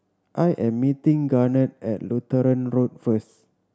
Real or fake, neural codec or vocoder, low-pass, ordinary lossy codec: real; none; none; none